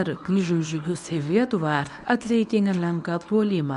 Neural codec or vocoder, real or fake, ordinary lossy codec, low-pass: codec, 24 kHz, 0.9 kbps, WavTokenizer, medium speech release version 1; fake; MP3, 96 kbps; 10.8 kHz